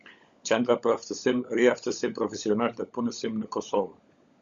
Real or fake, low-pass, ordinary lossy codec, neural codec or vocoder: fake; 7.2 kHz; Opus, 64 kbps; codec, 16 kHz, 16 kbps, FunCodec, trained on LibriTTS, 50 frames a second